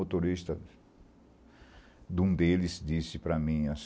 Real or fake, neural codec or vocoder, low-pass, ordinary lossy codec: real; none; none; none